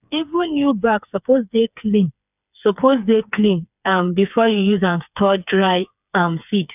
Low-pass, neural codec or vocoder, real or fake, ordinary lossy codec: 3.6 kHz; codec, 16 kHz, 4 kbps, FreqCodec, smaller model; fake; none